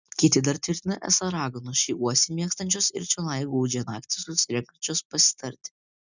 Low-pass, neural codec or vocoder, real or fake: 7.2 kHz; none; real